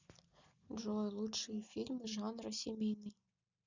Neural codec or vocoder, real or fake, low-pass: none; real; 7.2 kHz